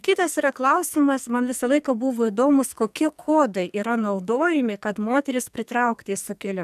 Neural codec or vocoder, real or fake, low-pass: codec, 44.1 kHz, 2.6 kbps, SNAC; fake; 14.4 kHz